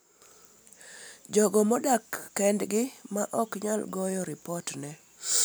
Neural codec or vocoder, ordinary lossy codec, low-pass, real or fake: none; none; none; real